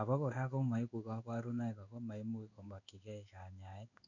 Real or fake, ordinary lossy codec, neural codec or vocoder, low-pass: fake; none; codec, 16 kHz in and 24 kHz out, 1 kbps, XY-Tokenizer; 7.2 kHz